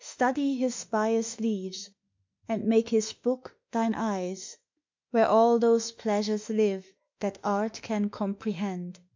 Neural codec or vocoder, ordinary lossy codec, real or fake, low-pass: autoencoder, 48 kHz, 32 numbers a frame, DAC-VAE, trained on Japanese speech; MP3, 64 kbps; fake; 7.2 kHz